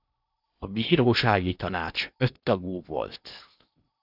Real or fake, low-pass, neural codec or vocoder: fake; 5.4 kHz; codec, 16 kHz in and 24 kHz out, 0.8 kbps, FocalCodec, streaming, 65536 codes